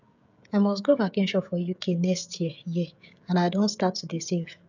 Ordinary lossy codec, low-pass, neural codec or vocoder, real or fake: none; 7.2 kHz; codec, 16 kHz, 16 kbps, FreqCodec, smaller model; fake